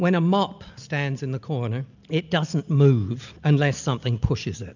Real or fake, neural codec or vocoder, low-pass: real; none; 7.2 kHz